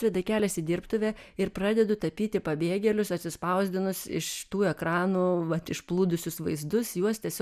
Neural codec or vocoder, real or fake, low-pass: none; real; 14.4 kHz